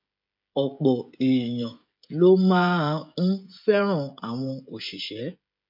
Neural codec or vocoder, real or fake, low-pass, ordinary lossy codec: codec, 16 kHz, 16 kbps, FreqCodec, smaller model; fake; 5.4 kHz; MP3, 48 kbps